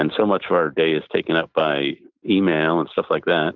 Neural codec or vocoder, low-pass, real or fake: none; 7.2 kHz; real